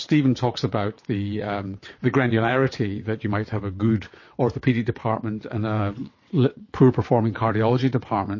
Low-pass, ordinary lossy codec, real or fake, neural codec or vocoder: 7.2 kHz; MP3, 32 kbps; fake; vocoder, 22.05 kHz, 80 mel bands, WaveNeXt